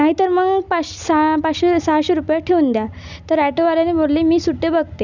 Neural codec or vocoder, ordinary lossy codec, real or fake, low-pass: none; none; real; 7.2 kHz